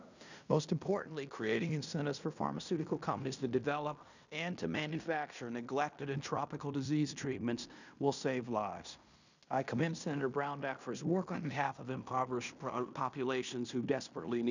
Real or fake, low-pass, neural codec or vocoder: fake; 7.2 kHz; codec, 16 kHz in and 24 kHz out, 0.9 kbps, LongCat-Audio-Codec, fine tuned four codebook decoder